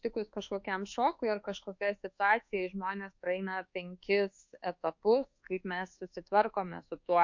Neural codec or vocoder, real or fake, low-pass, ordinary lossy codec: codec, 24 kHz, 1.2 kbps, DualCodec; fake; 7.2 kHz; MP3, 48 kbps